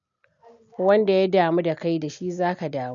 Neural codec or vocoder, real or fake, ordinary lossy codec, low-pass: none; real; none; 7.2 kHz